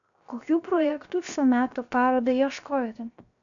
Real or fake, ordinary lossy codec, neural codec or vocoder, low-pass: fake; MP3, 96 kbps; codec, 16 kHz, 0.7 kbps, FocalCodec; 7.2 kHz